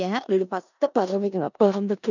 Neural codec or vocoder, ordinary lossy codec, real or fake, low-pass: codec, 16 kHz in and 24 kHz out, 0.4 kbps, LongCat-Audio-Codec, four codebook decoder; none; fake; 7.2 kHz